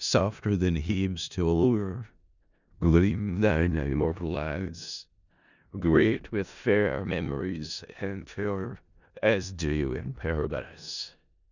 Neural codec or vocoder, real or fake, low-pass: codec, 16 kHz in and 24 kHz out, 0.4 kbps, LongCat-Audio-Codec, four codebook decoder; fake; 7.2 kHz